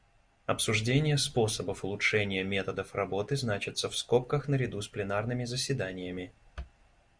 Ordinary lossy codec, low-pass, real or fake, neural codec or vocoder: Opus, 64 kbps; 9.9 kHz; real; none